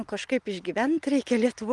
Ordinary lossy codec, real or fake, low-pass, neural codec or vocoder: Opus, 24 kbps; real; 10.8 kHz; none